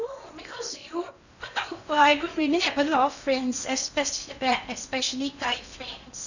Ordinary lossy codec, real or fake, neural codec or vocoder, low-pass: none; fake; codec, 16 kHz in and 24 kHz out, 0.8 kbps, FocalCodec, streaming, 65536 codes; 7.2 kHz